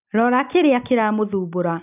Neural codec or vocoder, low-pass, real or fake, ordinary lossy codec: none; 3.6 kHz; real; none